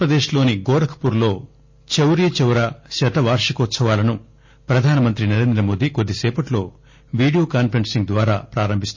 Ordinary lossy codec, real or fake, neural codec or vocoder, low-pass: MP3, 32 kbps; real; none; 7.2 kHz